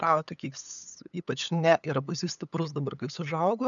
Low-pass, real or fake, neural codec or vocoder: 7.2 kHz; fake; codec, 16 kHz, 8 kbps, FunCodec, trained on LibriTTS, 25 frames a second